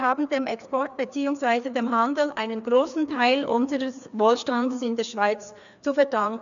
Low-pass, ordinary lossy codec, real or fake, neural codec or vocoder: 7.2 kHz; none; fake; codec, 16 kHz, 2 kbps, FreqCodec, larger model